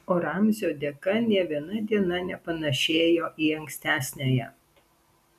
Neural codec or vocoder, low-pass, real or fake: none; 14.4 kHz; real